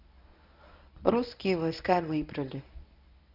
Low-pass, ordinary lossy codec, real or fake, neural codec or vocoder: 5.4 kHz; none; fake; codec, 24 kHz, 0.9 kbps, WavTokenizer, medium speech release version 1